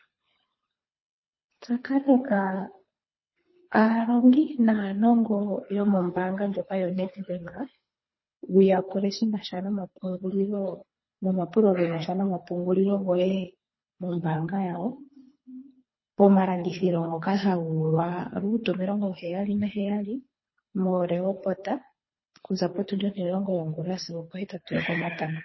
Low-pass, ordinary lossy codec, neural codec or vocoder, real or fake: 7.2 kHz; MP3, 24 kbps; codec, 24 kHz, 3 kbps, HILCodec; fake